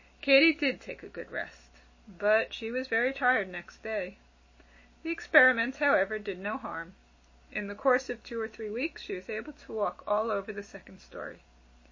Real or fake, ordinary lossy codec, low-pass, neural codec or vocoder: real; MP3, 32 kbps; 7.2 kHz; none